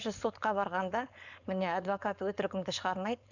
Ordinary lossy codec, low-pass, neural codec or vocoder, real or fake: none; 7.2 kHz; codec, 16 kHz, 4.8 kbps, FACodec; fake